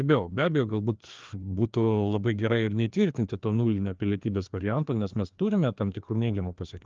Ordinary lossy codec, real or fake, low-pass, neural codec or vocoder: Opus, 24 kbps; fake; 7.2 kHz; codec, 16 kHz, 2 kbps, FreqCodec, larger model